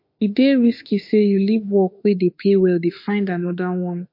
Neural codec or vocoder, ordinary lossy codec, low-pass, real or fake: autoencoder, 48 kHz, 32 numbers a frame, DAC-VAE, trained on Japanese speech; MP3, 32 kbps; 5.4 kHz; fake